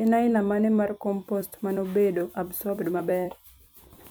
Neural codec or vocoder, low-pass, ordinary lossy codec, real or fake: vocoder, 44.1 kHz, 128 mel bands every 256 samples, BigVGAN v2; none; none; fake